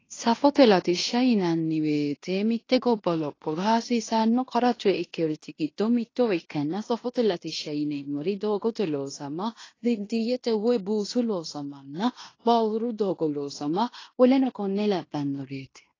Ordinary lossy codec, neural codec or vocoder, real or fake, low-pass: AAC, 32 kbps; codec, 16 kHz in and 24 kHz out, 0.9 kbps, LongCat-Audio-Codec, fine tuned four codebook decoder; fake; 7.2 kHz